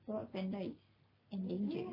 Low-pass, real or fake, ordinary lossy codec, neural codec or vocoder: 5.4 kHz; real; MP3, 48 kbps; none